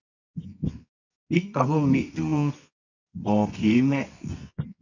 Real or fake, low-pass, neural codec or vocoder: fake; 7.2 kHz; codec, 24 kHz, 0.9 kbps, WavTokenizer, medium music audio release